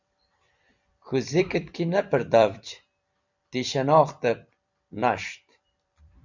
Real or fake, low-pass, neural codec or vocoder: fake; 7.2 kHz; vocoder, 44.1 kHz, 128 mel bands every 256 samples, BigVGAN v2